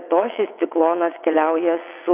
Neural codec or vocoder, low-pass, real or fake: vocoder, 22.05 kHz, 80 mel bands, WaveNeXt; 3.6 kHz; fake